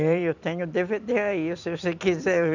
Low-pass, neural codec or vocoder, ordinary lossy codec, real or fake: 7.2 kHz; vocoder, 44.1 kHz, 128 mel bands every 256 samples, BigVGAN v2; none; fake